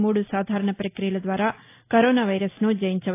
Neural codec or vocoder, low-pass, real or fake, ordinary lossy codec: none; 3.6 kHz; real; AAC, 24 kbps